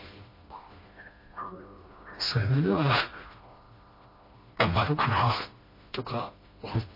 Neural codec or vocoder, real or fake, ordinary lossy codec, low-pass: codec, 16 kHz, 1 kbps, FreqCodec, smaller model; fake; MP3, 24 kbps; 5.4 kHz